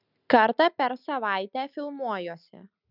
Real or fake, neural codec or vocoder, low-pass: real; none; 5.4 kHz